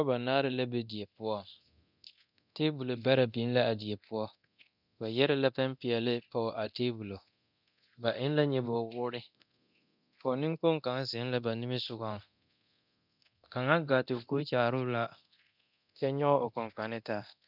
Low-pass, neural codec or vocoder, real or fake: 5.4 kHz; codec, 24 kHz, 0.9 kbps, DualCodec; fake